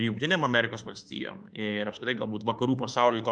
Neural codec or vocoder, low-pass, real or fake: autoencoder, 48 kHz, 32 numbers a frame, DAC-VAE, trained on Japanese speech; 9.9 kHz; fake